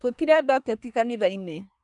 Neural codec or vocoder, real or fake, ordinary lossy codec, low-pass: codec, 24 kHz, 1 kbps, SNAC; fake; none; 10.8 kHz